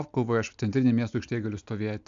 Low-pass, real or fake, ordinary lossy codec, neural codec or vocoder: 7.2 kHz; real; AAC, 64 kbps; none